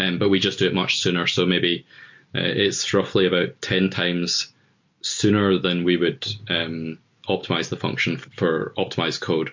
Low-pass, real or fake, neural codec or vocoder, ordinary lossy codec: 7.2 kHz; real; none; MP3, 48 kbps